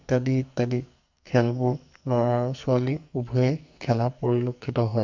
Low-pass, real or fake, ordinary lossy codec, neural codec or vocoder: 7.2 kHz; fake; MP3, 64 kbps; codec, 44.1 kHz, 2.6 kbps, SNAC